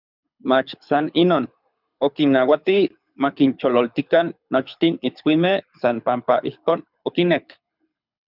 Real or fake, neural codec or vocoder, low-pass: fake; codec, 24 kHz, 6 kbps, HILCodec; 5.4 kHz